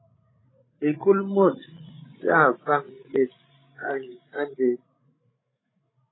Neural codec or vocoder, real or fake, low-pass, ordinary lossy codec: codec, 16 kHz, 16 kbps, FreqCodec, larger model; fake; 7.2 kHz; AAC, 16 kbps